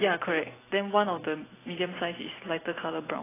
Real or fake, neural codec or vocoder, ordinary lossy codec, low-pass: fake; vocoder, 44.1 kHz, 128 mel bands every 256 samples, BigVGAN v2; AAC, 16 kbps; 3.6 kHz